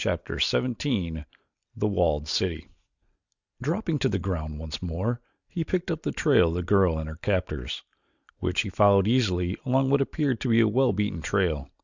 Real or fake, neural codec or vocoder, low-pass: real; none; 7.2 kHz